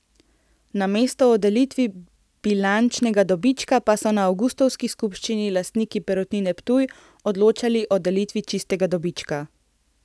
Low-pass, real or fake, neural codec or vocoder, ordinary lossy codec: none; real; none; none